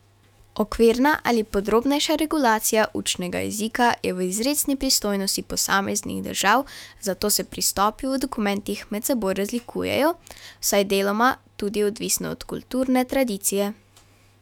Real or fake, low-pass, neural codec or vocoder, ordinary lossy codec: fake; 19.8 kHz; autoencoder, 48 kHz, 128 numbers a frame, DAC-VAE, trained on Japanese speech; none